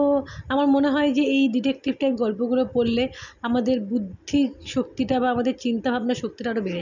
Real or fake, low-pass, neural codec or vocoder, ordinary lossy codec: real; 7.2 kHz; none; none